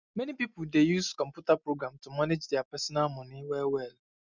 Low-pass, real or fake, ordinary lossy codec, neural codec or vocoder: 7.2 kHz; real; none; none